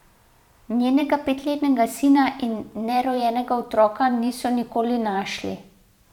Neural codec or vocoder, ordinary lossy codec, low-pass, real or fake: vocoder, 44.1 kHz, 128 mel bands every 512 samples, BigVGAN v2; none; 19.8 kHz; fake